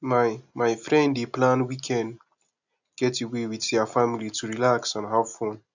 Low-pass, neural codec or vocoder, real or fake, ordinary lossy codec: 7.2 kHz; none; real; none